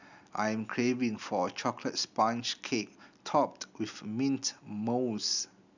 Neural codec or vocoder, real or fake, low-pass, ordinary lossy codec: none; real; 7.2 kHz; none